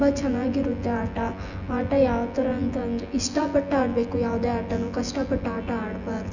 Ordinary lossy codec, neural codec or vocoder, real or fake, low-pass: none; vocoder, 24 kHz, 100 mel bands, Vocos; fake; 7.2 kHz